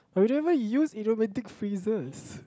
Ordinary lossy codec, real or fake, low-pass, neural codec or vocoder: none; real; none; none